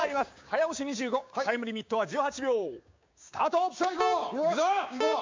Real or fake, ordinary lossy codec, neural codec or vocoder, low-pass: fake; MP3, 48 kbps; codec, 16 kHz, 6 kbps, DAC; 7.2 kHz